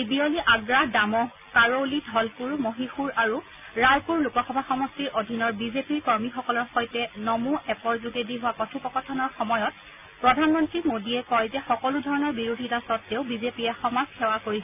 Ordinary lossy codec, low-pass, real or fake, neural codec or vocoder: none; 3.6 kHz; real; none